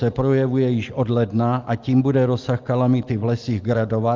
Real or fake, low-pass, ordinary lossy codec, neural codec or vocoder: real; 7.2 kHz; Opus, 24 kbps; none